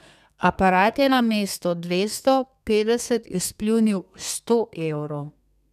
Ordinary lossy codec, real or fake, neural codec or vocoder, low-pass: none; fake; codec, 32 kHz, 1.9 kbps, SNAC; 14.4 kHz